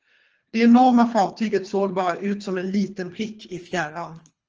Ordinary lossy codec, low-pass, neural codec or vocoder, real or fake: Opus, 24 kbps; 7.2 kHz; codec, 24 kHz, 3 kbps, HILCodec; fake